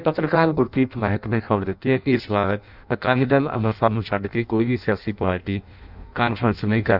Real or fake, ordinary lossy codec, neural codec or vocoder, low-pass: fake; none; codec, 16 kHz in and 24 kHz out, 0.6 kbps, FireRedTTS-2 codec; 5.4 kHz